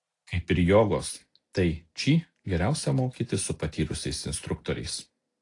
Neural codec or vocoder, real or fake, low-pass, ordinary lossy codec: none; real; 10.8 kHz; AAC, 48 kbps